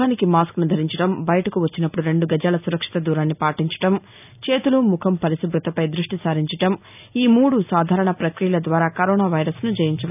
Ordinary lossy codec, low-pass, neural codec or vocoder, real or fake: none; 3.6 kHz; none; real